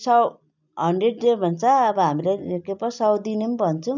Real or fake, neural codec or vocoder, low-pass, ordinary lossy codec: real; none; 7.2 kHz; none